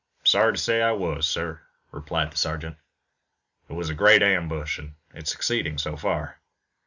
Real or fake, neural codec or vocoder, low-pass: real; none; 7.2 kHz